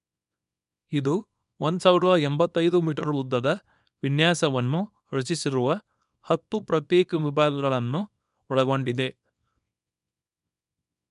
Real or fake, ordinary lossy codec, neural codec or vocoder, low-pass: fake; none; codec, 24 kHz, 0.9 kbps, WavTokenizer, small release; 10.8 kHz